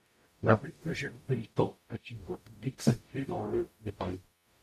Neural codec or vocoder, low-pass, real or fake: codec, 44.1 kHz, 0.9 kbps, DAC; 14.4 kHz; fake